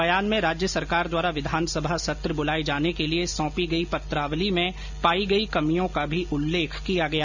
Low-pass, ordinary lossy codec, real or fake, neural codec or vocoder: 7.2 kHz; none; real; none